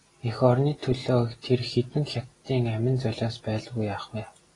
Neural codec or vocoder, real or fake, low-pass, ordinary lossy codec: none; real; 10.8 kHz; AAC, 32 kbps